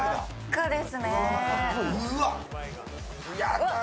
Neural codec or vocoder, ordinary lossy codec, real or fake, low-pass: none; none; real; none